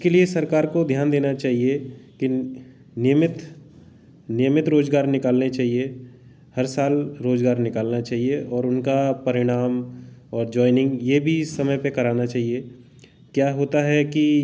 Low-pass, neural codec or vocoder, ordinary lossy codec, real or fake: none; none; none; real